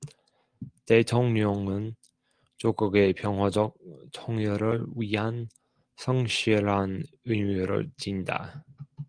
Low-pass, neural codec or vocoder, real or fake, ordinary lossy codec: 9.9 kHz; none; real; Opus, 24 kbps